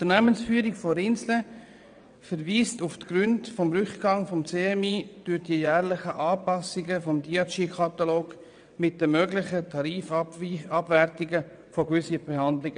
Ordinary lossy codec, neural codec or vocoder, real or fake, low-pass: none; vocoder, 22.05 kHz, 80 mel bands, WaveNeXt; fake; 9.9 kHz